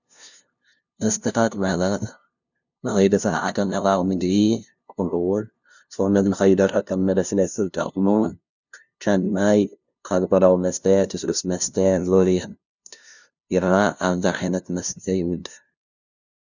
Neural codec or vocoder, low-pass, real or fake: codec, 16 kHz, 0.5 kbps, FunCodec, trained on LibriTTS, 25 frames a second; 7.2 kHz; fake